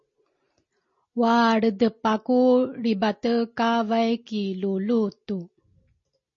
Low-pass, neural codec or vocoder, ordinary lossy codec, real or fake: 7.2 kHz; none; MP3, 32 kbps; real